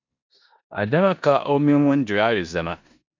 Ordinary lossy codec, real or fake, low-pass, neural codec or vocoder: MP3, 64 kbps; fake; 7.2 kHz; codec, 16 kHz in and 24 kHz out, 0.9 kbps, LongCat-Audio-Codec, four codebook decoder